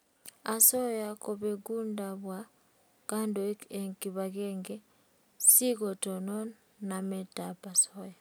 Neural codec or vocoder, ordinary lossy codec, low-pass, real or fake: none; none; none; real